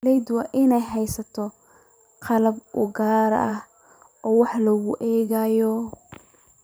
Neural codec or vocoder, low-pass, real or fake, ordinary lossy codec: vocoder, 44.1 kHz, 128 mel bands every 512 samples, BigVGAN v2; none; fake; none